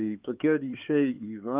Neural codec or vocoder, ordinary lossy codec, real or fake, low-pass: codec, 16 kHz, 4 kbps, X-Codec, HuBERT features, trained on LibriSpeech; Opus, 24 kbps; fake; 3.6 kHz